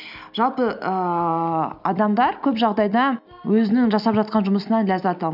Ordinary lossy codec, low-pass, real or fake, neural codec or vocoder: none; 5.4 kHz; real; none